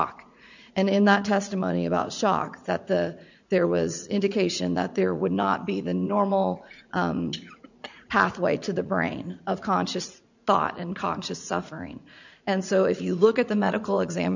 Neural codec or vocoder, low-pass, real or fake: vocoder, 44.1 kHz, 80 mel bands, Vocos; 7.2 kHz; fake